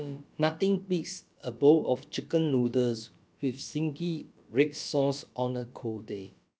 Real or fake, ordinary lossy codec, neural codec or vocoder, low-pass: fake; none; codec, 16 kHz, about 1 kbps, DyCAST, with the encoder's durations; none